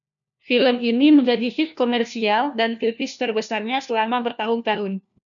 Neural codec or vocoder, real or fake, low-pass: codec, 16 kHz, 1 kbps, FunCodec, trained on LibriTTS, 50 frames a second; fake; 7.2 kHz